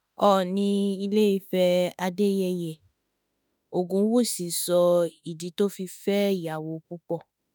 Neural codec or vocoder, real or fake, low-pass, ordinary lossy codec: autoencoder, 48 kHz, 32 numbers a frame, DAC-VAE, trained on Japanese speech; fake; none; none